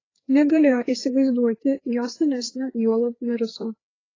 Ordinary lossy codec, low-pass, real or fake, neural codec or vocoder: AAC, 32 kbps; 7.2 kHz; fake; codec, 16 kHz, 2 kbps, FreqCodec, larger model